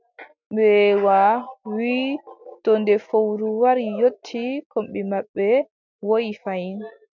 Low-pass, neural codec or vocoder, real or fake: 7.2 kHz; none; real